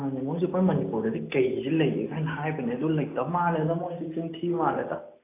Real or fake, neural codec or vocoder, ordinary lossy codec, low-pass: real; none; AAC, 24 kbps; 3.6 kHz